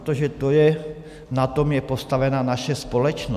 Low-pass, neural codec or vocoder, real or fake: 14.4 kHz; none; real